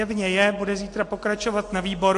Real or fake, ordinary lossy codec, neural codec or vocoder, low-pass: real; AAC, 48 kbps; none; 10.8 kHz